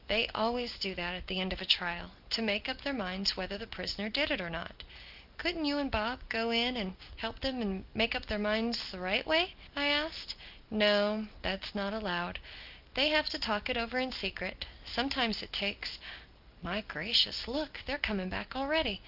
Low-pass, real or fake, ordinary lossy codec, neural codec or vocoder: 5.4 kHz; real; Opus, 32 kbps; none